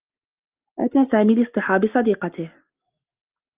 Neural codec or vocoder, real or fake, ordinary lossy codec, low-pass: none; real; Opus, 24 kbps; 3.6 kHz